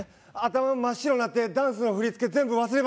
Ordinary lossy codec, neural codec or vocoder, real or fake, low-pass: none; none; real; none